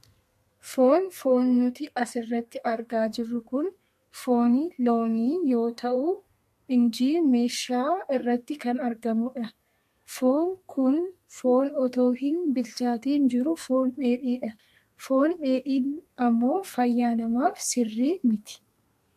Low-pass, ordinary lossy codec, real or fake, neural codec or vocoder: 14.4 kHz; MP3, 64 kbps; fake; codec, 32 kHz, 1.9 kbps, SNAC